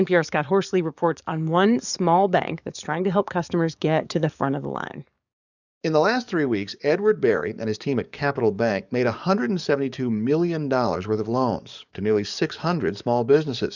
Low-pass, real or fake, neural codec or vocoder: 7.2 kHz; fake; codec, 44.1 kHz, 7.8 kbps, DAC